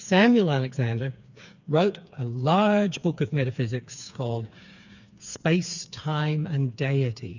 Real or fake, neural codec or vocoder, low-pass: fake; codec, 16 kHz, 4 kbps, FreqCodec, smaller model; 7.2 kHz